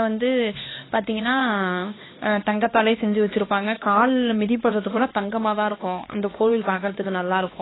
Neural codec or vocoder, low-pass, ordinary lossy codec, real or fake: codec, 16 kHz, 2 kbps, X-Codec, HuBERT features, trained on LibriSpeech; 7.2 kHz; AAC, 16 kbps; fake